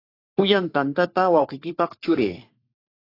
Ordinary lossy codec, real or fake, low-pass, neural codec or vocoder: AAC, 32 kbps; fake; 5.4 kHz; codec, 44.1 kHz, 3.4 kbps, Pupu-Codec